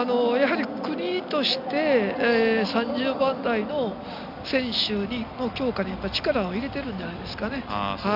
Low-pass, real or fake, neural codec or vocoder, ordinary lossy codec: 5.4 kHz; real; none; none